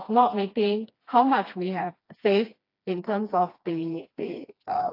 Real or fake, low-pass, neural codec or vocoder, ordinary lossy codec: fake; 5.4 kHz; codec, 16 kHz, 2 kbps, FreqCodec, smaller model; AAC, 32 kbps